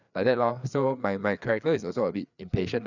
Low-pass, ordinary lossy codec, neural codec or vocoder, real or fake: 7.2 kHz; none; codec, 16 kHz, 4 kbps, FreqCodec, larger model; fake